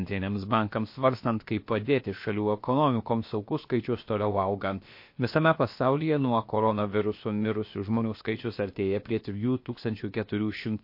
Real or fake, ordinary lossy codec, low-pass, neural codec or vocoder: fake; MP3, 32 kbps; 5.4 kHz; codec, 16 kHz, about 1 kbps, DyCAST, with the encoder's durations